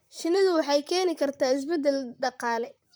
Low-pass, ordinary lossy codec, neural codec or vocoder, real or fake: none; none; vocoder, 44.1 kHz, 128 mel bands, Pupu-Vocoder; fake